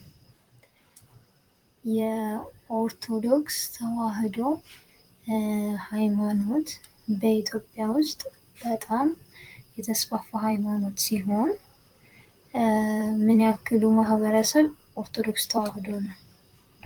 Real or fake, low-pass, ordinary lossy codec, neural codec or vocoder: fake; 19.8 kHz; Opus, 32 kbps; vocoder, 44.1 kHz, 128 mel bands, Pupu-Vocoder